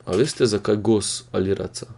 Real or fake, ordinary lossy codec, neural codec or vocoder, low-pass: real; Opus, 64 kbps; none; 10.8 kHz